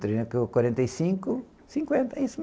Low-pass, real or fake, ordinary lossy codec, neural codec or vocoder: none; real; none; none